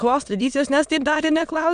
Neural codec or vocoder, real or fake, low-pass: autoencoder, 22.05 kHz, a latent of 192 numbers a frame, VITS, trained on many speakers; fake; 9.9 kHz